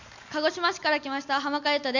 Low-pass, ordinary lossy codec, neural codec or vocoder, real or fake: 7.2 kHz; none; none; real